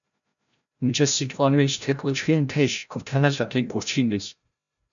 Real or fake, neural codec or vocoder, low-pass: fake; codec, 16 kHz, 0.5 kbps, FreqCodec, larger model; 7.2 kHz